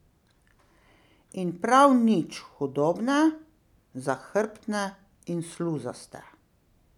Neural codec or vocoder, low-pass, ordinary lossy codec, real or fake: none; 19.8 kHz; none; real